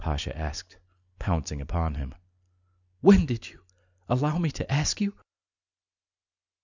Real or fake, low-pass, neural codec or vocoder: real; 7.2 kHz; none